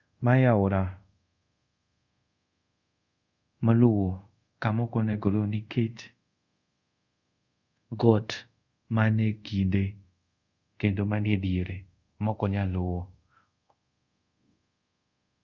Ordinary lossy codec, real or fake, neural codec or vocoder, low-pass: Opus, 64 kbps; fake; codec, 24 kHz, 0.5 kbps, DualCodec; 7.2 kHz